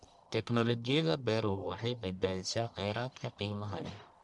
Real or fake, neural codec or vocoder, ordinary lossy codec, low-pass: fake; codec, 44.1 kHz, 1.7 kbps, Pupu-Codec; none; 10.8 kHz